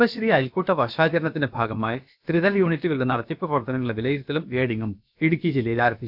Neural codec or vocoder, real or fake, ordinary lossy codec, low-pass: codec, 16 kHz, about 1 kbps, DyCAST, with the encoder's durations; fake; none; 5.4 kHz